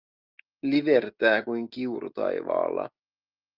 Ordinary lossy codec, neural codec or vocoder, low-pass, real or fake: Opus, 24 kbps; none; 5.4 kHz; real